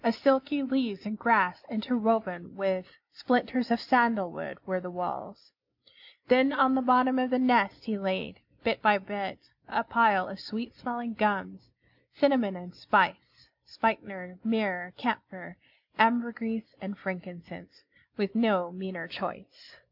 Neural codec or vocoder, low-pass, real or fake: none; 5.4 kHz; real